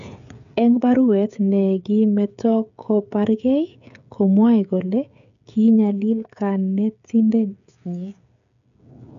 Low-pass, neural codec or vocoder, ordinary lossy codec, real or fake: 7.2 kHz; codec, 16 kHz, 16 kbps, FreqCodec, smaller model; none; fake